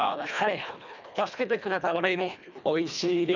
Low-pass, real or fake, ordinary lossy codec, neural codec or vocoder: 7.2 kHz; fake; none; codec, 24 kHz, 1.5 kbps, HILCodec